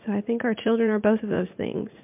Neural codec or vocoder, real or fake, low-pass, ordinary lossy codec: vocoder, 44.1 kHz, 80 mel bands, Vocos; fake; 3.6 kHz; MP3, 32 kbps